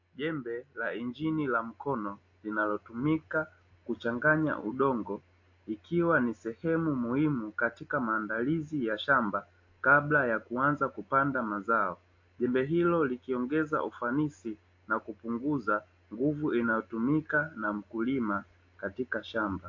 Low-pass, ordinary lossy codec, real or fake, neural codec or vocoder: 7.2 kHz; AAC, 48 kbps; real; none